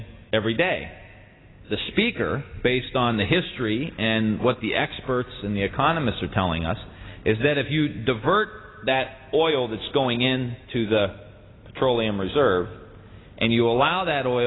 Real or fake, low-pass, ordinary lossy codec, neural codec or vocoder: real; 7.2 kHz; AAC, 16 kbps; none